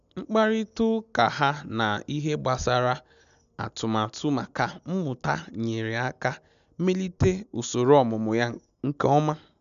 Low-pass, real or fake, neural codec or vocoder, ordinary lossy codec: 7.2 kHz; real; none; none